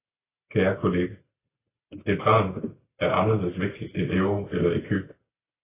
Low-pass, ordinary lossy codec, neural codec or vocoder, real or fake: 3.6 kHz; AAC, 16 kbps; none; real